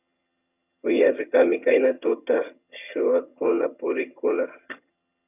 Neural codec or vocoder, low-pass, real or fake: vocoder, 22.05 kHz, 80 mel bands, HiFi-GAN; 3.6 kHz; fake